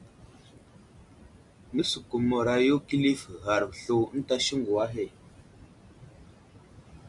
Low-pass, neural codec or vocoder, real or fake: 10.8 kHz; none; real